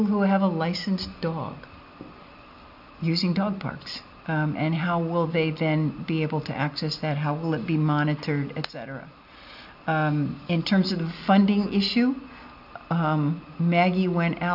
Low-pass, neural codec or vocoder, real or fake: 5.4 kHz; none; real